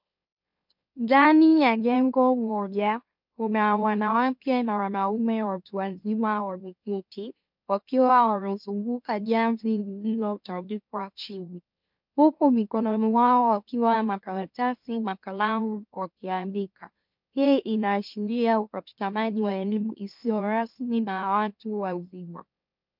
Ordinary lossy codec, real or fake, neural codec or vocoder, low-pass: MP3, 48 kbps; fake; autoencoder, 44.1 kHz, a latent of 192 numbers a frame, MeloTTS; 5.4 kHz